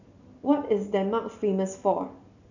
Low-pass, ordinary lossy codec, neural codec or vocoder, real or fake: 7.2 kHz; none; none; real